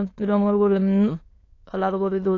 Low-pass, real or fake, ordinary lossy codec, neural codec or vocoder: 7.2 kHz; fake; AAC, 32 kbps; autoencoder, 22.05 kHz, a latent of 192 numbers a frame, VITS, trained on many speakers